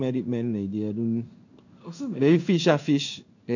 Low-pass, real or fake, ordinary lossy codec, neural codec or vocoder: 7.2 kHz; fake; none; codec, 16 kHz in and 24 kHz out, 1 kbps, XY-Tokenizer